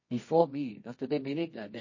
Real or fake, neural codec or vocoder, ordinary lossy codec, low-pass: fake; codec, 24 kHz, 0.9 kbps, WavTokenizer, medium music audio release; MP3, 32 kbps; 7.2 kHz